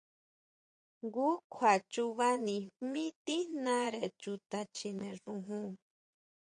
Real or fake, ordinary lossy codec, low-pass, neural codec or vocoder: fake; MP3, 64 kbps; 9.9 kHz; vocoder, 22.05 kHz, 80 mel bands, Vocos